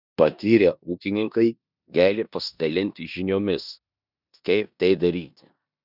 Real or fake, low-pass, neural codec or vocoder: fake; 5.4 kHz; codec, 16 kHz in and 24 kHz out, 0.9 kbps, LongCat-Audio-Codec, four codebook decoder